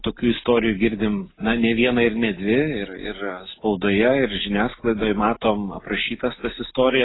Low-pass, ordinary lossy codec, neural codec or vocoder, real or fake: 7.2 kHz; AAC, 16 kbps; none; real